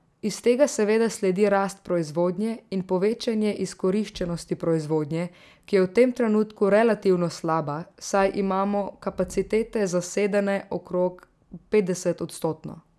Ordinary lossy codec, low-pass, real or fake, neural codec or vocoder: none; none; real; none